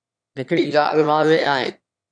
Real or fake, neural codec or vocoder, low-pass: fake; autoencoder, 22.05 kHz, a latent of 192 numbers a frame, VITS, trained on one speaker; 9.9 kHz